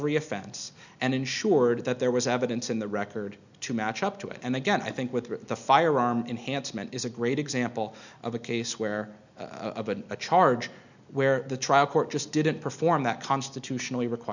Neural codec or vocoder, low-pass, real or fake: none; 7.2 kHz; real